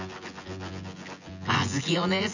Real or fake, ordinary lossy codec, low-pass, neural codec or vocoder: fake; none; 7.2 kHz; vocoder, 24 kHz, 100 mel bands, Vocos